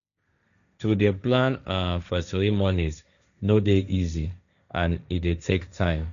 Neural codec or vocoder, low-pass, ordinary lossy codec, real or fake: codec, 16 kHz, 1.1 kbps, Voila-Tokenizer; 7.2 kHz; none; fake